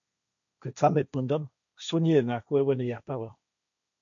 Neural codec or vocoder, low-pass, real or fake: codec, 16 kHz, 1.1 kbps, Voila-Tokenizer; 7.2 kHz; fake